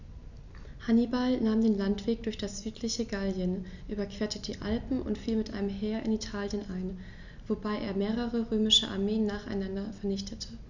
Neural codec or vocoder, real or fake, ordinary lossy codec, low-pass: none; real; none; 7.2 kHz